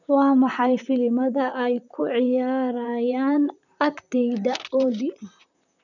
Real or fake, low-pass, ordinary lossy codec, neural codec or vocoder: fake; 7.2 kHz; none; vocoder, 44.1 kHz, 128 mel bands, Pupu-Vocoder